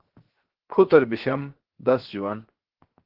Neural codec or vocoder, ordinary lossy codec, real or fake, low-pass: codec, 16 kHz, 0.7 kbps, FocalCodec; Opus, 16 kbps; fake; 5.4 kHz